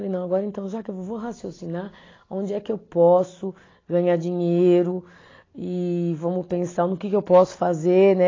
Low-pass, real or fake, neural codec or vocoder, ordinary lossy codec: 7.2 kHz; real; none; AAC, 32 kbps